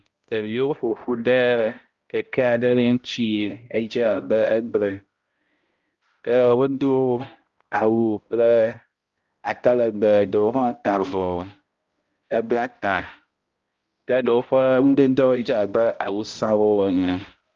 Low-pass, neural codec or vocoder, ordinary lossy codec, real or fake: 7.2 kHz; codec, 16 kHz, 0.5 kbps, X-Codec, HuBERT features, trained on balanced general audio; Opus, 32 kbps; fake